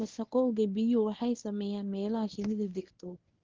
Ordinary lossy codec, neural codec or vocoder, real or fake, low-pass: Opus, 16 kbps; codec, 24 kHz, 0.9 kbps, WavTokenizer, medium speech release version 1; fake; 7.2 kHz